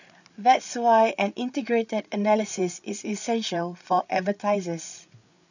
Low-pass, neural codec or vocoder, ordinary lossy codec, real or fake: 7.2 kHz; codec, 16 kHz, 8 kbps, FreqCodec, larger model; none; fake